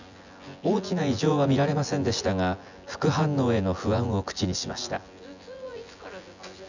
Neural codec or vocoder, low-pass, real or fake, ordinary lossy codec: vocoder, 24 kHz, 100 mel bands, Vocos; 7.2 kHz; fake; none